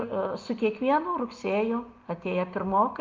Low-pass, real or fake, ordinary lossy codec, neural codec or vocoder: 7.2 kHz; real; Opus, 24 kbps; none